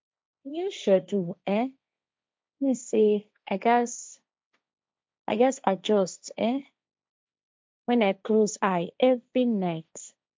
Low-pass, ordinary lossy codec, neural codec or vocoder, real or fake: none; none; codec, 16 kHz, 1.1 kbps, Voila-Tokenizer; fake